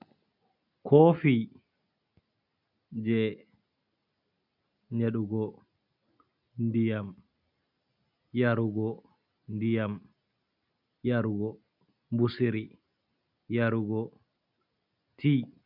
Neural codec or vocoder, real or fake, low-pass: none; real; 5.4 kHz